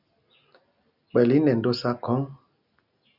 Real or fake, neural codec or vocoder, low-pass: real; none; 5.4 kHz